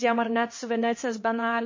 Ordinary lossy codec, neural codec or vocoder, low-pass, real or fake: MP3, 32 kbps; codec, 16 kHz, 2 kbps, X-Codec, HuBERT features, trained on LibriSpeech; 7.2 kHz; fake